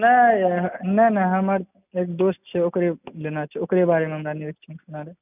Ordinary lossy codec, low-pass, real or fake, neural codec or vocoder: none; 3.6 kHz; real; none